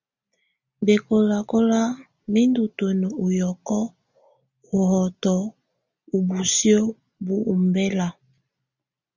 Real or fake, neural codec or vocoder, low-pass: real; none; 7.2 kHz